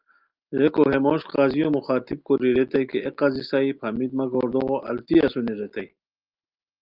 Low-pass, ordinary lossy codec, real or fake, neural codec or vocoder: 5.4 kHz; Opus, 24 kbps; real; none